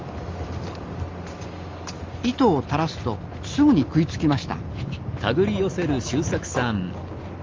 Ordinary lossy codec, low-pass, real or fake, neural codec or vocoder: Opus, 32 kbps; 7.2 kHz; real; none